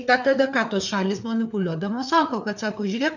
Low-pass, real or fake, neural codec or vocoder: 7.2 kHz; fake; codec, 16 kHz, 4 kbps, FreqCodec, larger model